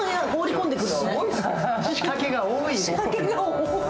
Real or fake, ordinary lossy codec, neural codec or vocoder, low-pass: real; none; none; none